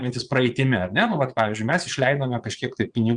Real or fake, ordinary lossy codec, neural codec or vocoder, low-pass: fake; Opus, 64 kbps; vocoder, 22.05 kHz, 80 mel bands, Vocos; 9.9 kHz